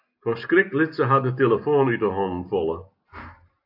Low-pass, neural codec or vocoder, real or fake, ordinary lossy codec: 5.4 kHz; none; real; AAC, 48 kbps